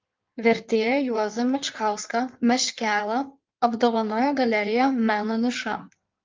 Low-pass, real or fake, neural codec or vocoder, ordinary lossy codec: 7.2 kHz; fake; codec, 16 kHz in and 24 kHz out, 1.1 kbps, FireRedTTS-2 codec; Opus, 24 kbps